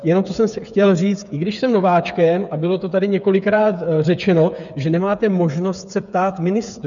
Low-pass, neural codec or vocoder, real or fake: 7.2 kHz; codec, 16 kHz, 8 kbps, FreqCodec, smaller model; fake